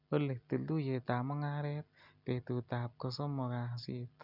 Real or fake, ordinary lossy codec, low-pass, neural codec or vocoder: real; none; 5.4 kHz; none